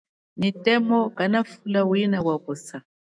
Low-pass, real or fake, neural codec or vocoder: 9.9 kHz; fake; autoencoder, 48 kHz, 128 numbers a frame, DAC-VAE, trained on Japanese speech